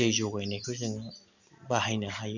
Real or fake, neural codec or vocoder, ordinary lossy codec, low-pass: real; none; none; 7.2 kHz